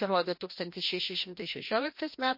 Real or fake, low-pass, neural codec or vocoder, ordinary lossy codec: fake; 5.4 kHz; codec, 16 kHz, 1 kbps, FreqCodec, larger model; MP3, 32 kbps